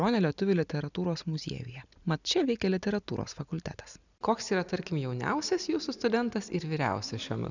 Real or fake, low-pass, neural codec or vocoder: real; 7.2 kHz; none